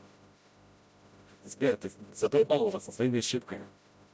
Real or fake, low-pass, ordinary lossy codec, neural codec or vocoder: fake; none; none; codec, 16 kHz, 0.5 kbps, FreqCodec, smaller model